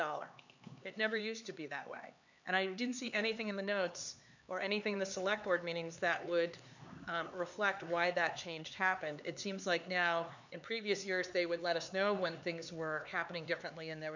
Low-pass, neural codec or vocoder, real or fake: 7.2 kHz; codec, 16 kHz, 4 kbps, X-Codec, HuBERT features, trained on LibriSpeech; fake